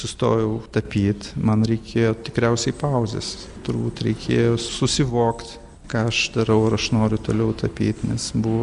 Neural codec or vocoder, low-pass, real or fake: none; 10.8 kHz; real